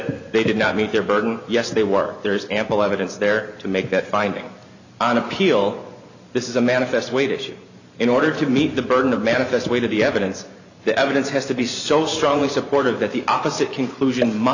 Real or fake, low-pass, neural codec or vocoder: fake; 7.2 kHz; vocoder, 44.1 kHz, 128 mel bands every 512 samples, BigVGAN v2